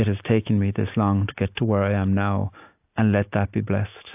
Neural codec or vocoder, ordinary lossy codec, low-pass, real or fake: none; AAC, 32 kbps; 3.6 kHz; real